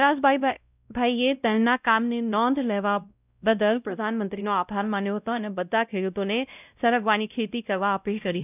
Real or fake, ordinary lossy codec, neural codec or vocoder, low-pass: fake; none; codec, 16 kHz, 0.5 kbps, X-Codec, WavLM features, trained on Multilingual LibriSpeech; 3.6 kHz